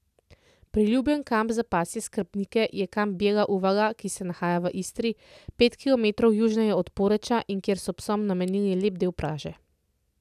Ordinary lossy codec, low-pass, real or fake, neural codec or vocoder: none; 14.4 kHz; real; none